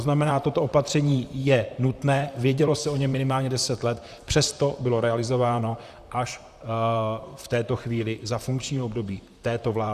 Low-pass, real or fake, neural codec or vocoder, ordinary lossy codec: 14.4 kHz; fake; vocoder, 44.1 kHz, 128 mel bands, Pupu-Vocoder; Opus, 64 kbps